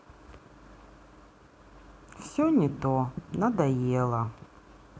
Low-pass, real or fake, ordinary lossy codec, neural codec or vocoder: none; real; none; none